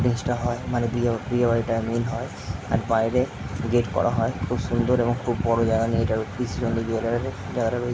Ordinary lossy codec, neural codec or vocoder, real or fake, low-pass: none; none; real; none